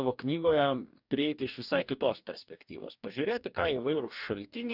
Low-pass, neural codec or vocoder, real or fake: 5.4 kHz; codec, 44.1 kHz, 2.6 kbps, DAC; fake